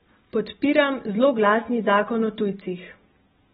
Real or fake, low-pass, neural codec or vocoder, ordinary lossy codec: real; 9.9 kHz; none; AAC, 16 kbps